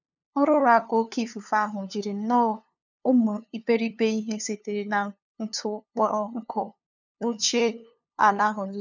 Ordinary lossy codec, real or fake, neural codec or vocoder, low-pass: none; fake; codec, 16 kHz, 2 kbps, FunCodec, trained on LibriTTS, 25 frames a second; 7.2 kHz